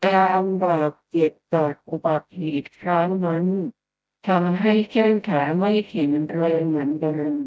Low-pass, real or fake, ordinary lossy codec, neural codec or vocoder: none; fake; none; codec, 16 kHz, 0.5 kbps, FreqCodec, smaller model